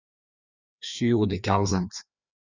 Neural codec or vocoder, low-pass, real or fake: codec, 16 kHz, 2 kbps, FreqCodec, larger model; 7.2 kHz; fake